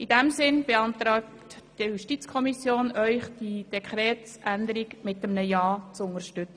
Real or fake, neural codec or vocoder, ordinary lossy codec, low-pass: real; none; none; 9.9 kHz